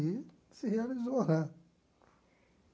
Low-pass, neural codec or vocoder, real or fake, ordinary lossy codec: none; none; real; none